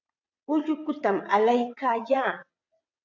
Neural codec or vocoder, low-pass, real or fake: vocoder, 22.05 kHz, 80 mel bands, WaveNeXt; 7.2 kHz; fake